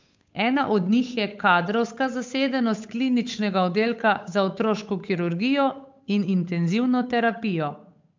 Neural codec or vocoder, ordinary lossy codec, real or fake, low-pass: codec, 16 kHz, 8 kbps, FunCodec, trained on Chinese and English, 25 frames a second; MP3, 64 kbps; fake; 7.2 kHz